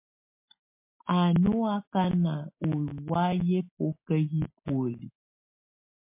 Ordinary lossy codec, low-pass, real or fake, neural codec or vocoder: MP3, 24 kbps; 3.6 kHz; real; none